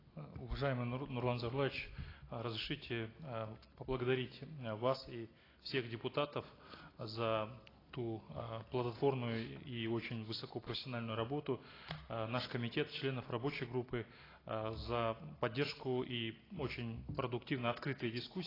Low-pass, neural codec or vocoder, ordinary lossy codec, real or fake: 5.4 kHz; none; AAC, 24 kbps; real